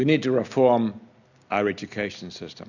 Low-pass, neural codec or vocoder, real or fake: 7.2 kHz; none; real